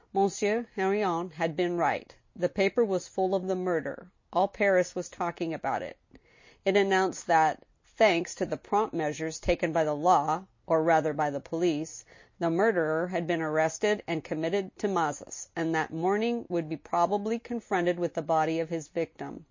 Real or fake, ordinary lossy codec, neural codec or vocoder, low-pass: real; MP3, 32 kbps; none; 7.2 kHz